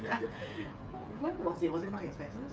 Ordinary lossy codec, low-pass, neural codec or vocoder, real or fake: none; none; codec, 16 kHz, 8 kbps, FreqCodec, smaller model; fake